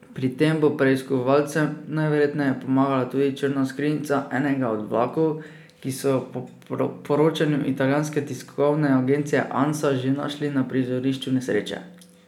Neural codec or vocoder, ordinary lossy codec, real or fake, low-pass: none; none; real; 19.8 kHz